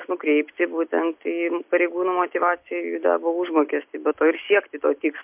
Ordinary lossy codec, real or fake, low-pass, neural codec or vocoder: MP3, 32 kbps; real; 3.6 kHz; none